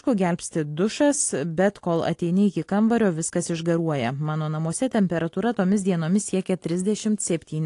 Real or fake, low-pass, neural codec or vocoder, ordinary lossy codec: real; 10.8 kHz; none; AAC, 48 kbps